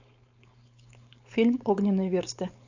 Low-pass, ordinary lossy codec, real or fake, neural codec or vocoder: 7.2 kHz; AAC, 48 kbps; fake; codec, 16 kHz, 4.8 kbps, FACodec